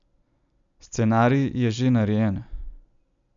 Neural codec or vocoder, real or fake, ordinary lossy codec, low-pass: none; real; none; 7.2 kHz